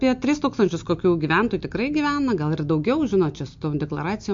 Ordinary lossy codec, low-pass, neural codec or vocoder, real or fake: MP3, 48 kbps; 7.2 kHz; none; real